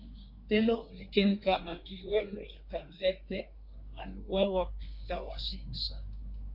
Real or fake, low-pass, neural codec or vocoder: fake; 5.4 kHz; codec, 24 kHz, 1 kbps, SNAC